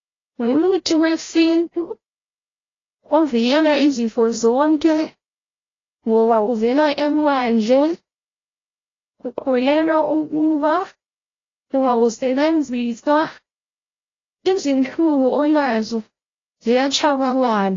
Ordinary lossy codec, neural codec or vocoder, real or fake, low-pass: AAC, 32 kbps; codec, 16 kHz, 0.5 kbps, FreqCodec, larger model; fake; 7.2 kHz